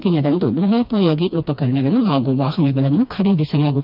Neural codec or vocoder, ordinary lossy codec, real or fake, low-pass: codec, 16 kHz, 2 kbps, FreqCodec, smaller model; none; fake; 5.4 kHz